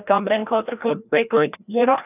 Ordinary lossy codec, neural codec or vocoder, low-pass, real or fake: none; codec, 16 kHz, 1 kbps, FreqCodec, larger model; 3.6 kHz; fake